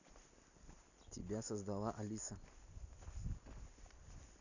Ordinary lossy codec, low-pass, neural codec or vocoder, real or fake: none; 7.2 kHz; none; real